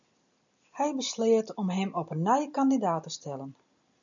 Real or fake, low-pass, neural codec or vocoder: real; 7.2 kHz; none